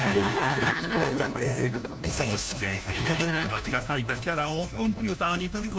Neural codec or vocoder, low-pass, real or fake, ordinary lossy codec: codec, 16 kHz, 1 kbps, FunCodec, trained on LibriTTS, 50 frames a second; none; fake; none